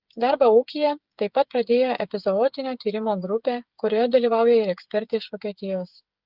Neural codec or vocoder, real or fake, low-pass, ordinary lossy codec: codec, 16 kHz, 8 kbps, FreqCodec, smaller model; fake; 5.4 kHz; Opus, 32 kbps